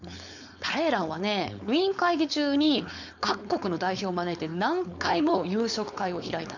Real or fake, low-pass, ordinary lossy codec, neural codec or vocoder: fake; 7.2 kHz; none; codec, 16 kHz, 4.8 kbps, FACodec